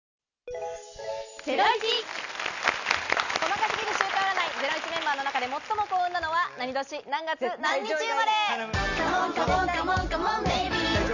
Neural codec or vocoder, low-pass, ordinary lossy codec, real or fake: none; 7.2 kHz; none; real